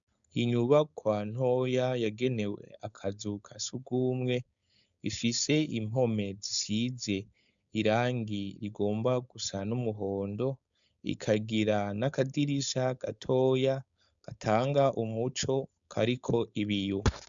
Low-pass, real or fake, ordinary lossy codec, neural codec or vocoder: 7.2 kHz; fake; MP3, 96 kbps; codec, 16 kHz, 4.8 kbps, FACodec